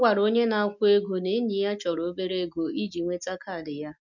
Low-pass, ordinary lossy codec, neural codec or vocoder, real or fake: 7.2 kHz; none; none; real